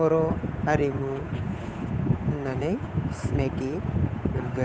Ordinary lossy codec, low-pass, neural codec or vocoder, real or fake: none; none; codec, 16 kHz, 8 kbps, FunCodec, trained on Chinese and English, 25 frames a second; fake